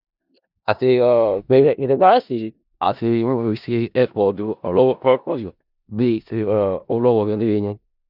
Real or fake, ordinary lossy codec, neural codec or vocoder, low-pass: fake; none; codec, 16 kHz in and 24 kHz out, 0.4 kbps, LongCat-Audio-Codec, four codebook decoder; 5.4 kHz